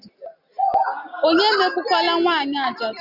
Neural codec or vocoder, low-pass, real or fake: none; 5.4 kHz; real